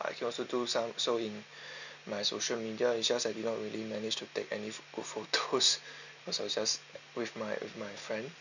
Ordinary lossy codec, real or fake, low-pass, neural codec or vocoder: none; real; 7.2 kHz; none